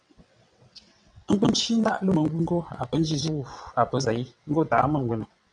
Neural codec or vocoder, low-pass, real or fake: vocoder, 22.05 kHz, 80 mel bands, WaveNeXt; 9.9 kHz; fake